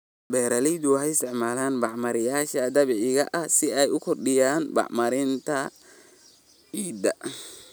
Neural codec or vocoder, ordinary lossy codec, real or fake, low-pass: none; none; real; none